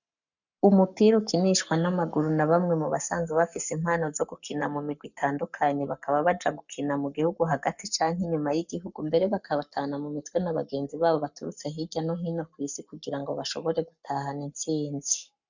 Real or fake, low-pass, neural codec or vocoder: fake; 7.2 kHz; codec, 44.1 kHz, 7.8 kbps, Pupu-Codec